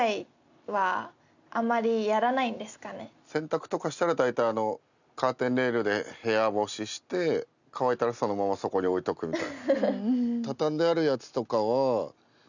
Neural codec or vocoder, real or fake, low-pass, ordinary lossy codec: none; real; 7.2 kHz; none